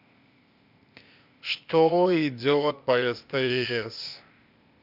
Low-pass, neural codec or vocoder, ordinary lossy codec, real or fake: 5.4 kHz; codec, 16 kHz, 0.8 kbps, ZipCodec; Opus, 64 kbps; fake